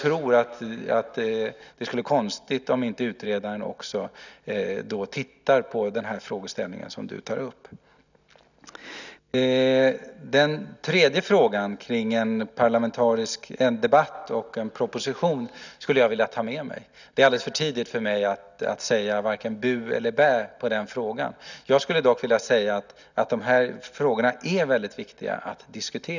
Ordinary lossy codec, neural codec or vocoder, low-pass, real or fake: none; none; 7.2 kHz; real